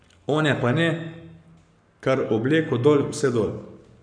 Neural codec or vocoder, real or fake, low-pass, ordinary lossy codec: codec, 44.1 kHz, 7.8 kbps, Pupu-Codec; fake; 9.9 kHz; none